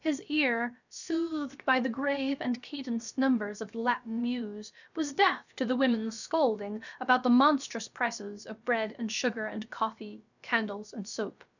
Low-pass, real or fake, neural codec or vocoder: 7.2 kHz; fake; codec, 16 kHz, about 1 kbps, DyCAST, with the encoder's durations